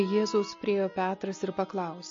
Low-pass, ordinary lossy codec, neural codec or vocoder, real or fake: 7.2 kHz; MP3, 32 kbps; none; real